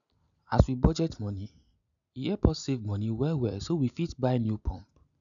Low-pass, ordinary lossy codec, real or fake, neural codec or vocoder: 7.2 kHz; none; real; none